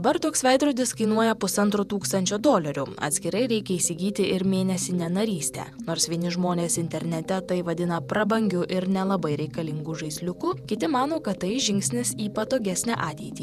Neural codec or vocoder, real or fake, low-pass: vocoder, 48 kHz, 128 mel bands, Vocos; fake; 14.4 kHz